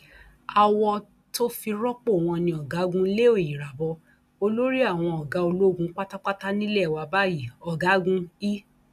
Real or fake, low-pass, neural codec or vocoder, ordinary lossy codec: real; 14.4 kHz; none; none